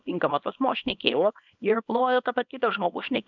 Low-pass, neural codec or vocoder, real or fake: 7.2 kHz; codec, 24 kHz, 0.9 kbps, WavTokenizer, small release; fake